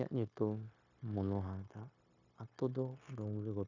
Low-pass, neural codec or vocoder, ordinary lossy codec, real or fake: 7.2 kHz; codec, 16 kHz, 0.9 kbps, LongCat-Audio-Codec; none; fake